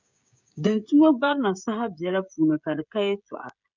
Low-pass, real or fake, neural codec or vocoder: 7.2 kHz; fake; codec, 16 kHz, 16 kbps, FreqCodec, smaller model